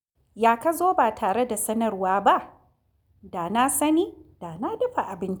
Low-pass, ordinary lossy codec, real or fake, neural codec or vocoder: none; none; real; none